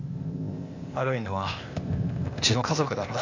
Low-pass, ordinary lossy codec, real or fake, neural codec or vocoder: 7.2 kHz; AAC, 48 kbps; fake; codec, 16 kHz, 0.8 kbps, ZipCodec